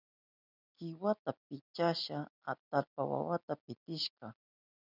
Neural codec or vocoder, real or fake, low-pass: none; real; 5.4 kHz